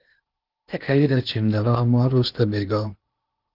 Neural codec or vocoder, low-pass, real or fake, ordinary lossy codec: codec, 16 kHz in and 24 kHz out, 0.8 kbps, FocalCodec, streaming, 65536 codes; 5.4 kHz; fake; Opus, 32 kbps